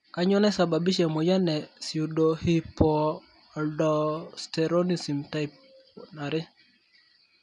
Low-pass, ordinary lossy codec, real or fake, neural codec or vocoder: 10.8 kHz; none; real; none